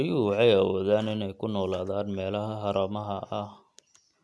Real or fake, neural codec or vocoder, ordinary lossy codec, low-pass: real; none; none; none